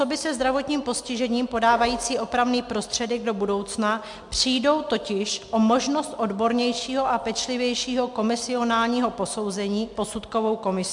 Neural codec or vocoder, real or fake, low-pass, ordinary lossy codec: none; real; 10.8 kHz; MP3, 64 kbps